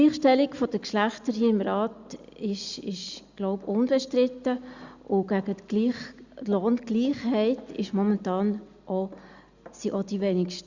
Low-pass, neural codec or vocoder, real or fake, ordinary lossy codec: 7.2 kHz; none; real; Opus, 64 kbps